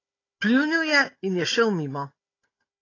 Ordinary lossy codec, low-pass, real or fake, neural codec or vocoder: AAC, 32 kbps; 7.2 kHz; fake; codec, 16 kHz, 16 kbps, FunCodec, trained on Chinese and English, 50 frames a second